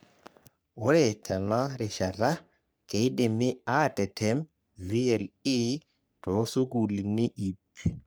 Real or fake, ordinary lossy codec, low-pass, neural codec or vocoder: fake; none; none; codec, 44.1 kHz, 3.4 kbps, Pupu-Codec